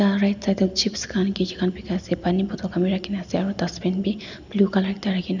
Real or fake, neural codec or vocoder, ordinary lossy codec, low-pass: real; none; none; 7.2 kHz